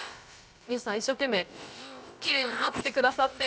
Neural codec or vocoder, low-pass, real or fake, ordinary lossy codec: codec, 16 kHz, about 1 kbps, DyCAST, with the encoder's durations; none; fake; none